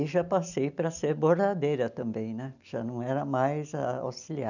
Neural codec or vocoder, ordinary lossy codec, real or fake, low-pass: none; none; real; 7.2 kHz